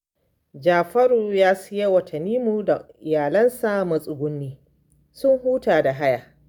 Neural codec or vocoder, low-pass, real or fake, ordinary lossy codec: none; none; real; none